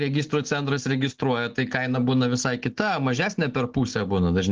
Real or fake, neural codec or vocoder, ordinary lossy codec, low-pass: real; none; Opus, 16 kbps; 7.2 kHz